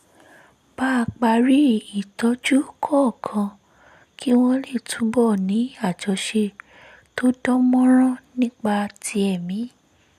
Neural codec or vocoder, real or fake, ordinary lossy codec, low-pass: none; real; none; 14.4 kHz